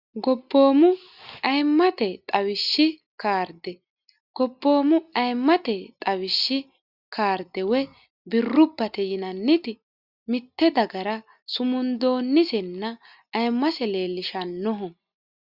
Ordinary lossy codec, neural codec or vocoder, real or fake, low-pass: Opus, 64 kbps; none; real; 5.4 kHz